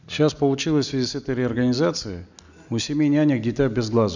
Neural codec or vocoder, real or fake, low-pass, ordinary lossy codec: none; real; 7.2 kHz; none